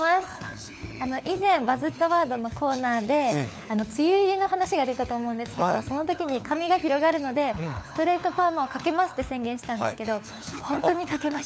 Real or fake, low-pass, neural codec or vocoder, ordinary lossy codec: fake; none; codec, 16 kHz, 4 kbps, FunCodec, trained on LibriTTS, 50 frames a second; none